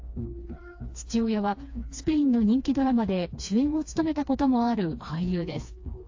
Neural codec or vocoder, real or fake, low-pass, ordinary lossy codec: codec, 16 kHz, 2 kbps, FreqCodec, smaller model; fake; 7.2 kHz; AAC, 48 kbps